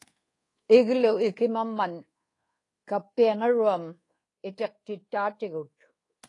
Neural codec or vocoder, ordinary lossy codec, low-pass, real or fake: codec, 24 kHz, 1.2 kbps, DualCodec; AAC, 32 kbps; 10.8 kHz; fake